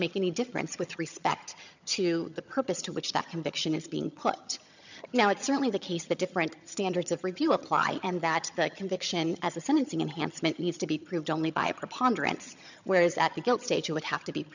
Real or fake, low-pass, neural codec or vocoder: fake; 7.2 kHz; vocoder, 22.05 kHz, 80 mel bands, HiFi-GAN